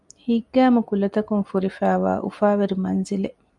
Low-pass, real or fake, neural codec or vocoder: 10.8 kHz; real; none